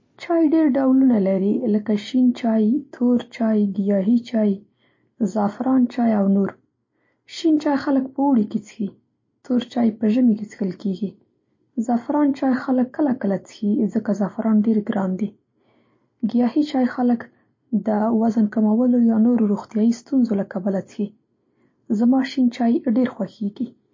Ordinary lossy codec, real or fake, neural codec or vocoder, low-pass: MP3, 32 kbps; real; none; 7.2 kHz